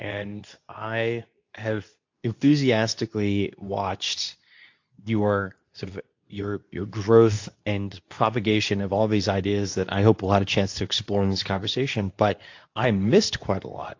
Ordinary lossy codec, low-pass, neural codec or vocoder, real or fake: AAC, 48 kbps; 7.2 kHz; codec, 24 kHz, 0.9 kbps, WavTokenizer, medium speech release version 2; fake